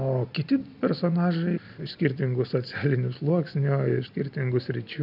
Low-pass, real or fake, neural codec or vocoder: 5.4 kHz; real; none